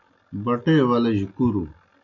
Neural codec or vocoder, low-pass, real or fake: none; 7.2 kHz; real